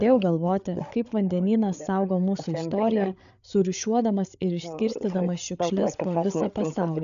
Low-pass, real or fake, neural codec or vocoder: 7.2 kHz; fake; codec, 16 kHz, 8 kbps, FreqCodec, larger model